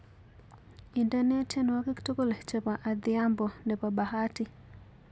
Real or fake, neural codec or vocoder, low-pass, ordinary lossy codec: real; none; none; none